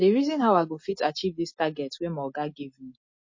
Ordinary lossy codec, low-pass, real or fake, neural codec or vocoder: MP3, 32 kbps; 7.2 kHz; real; none